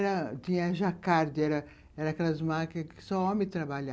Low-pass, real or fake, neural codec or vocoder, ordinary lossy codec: none; real; none; none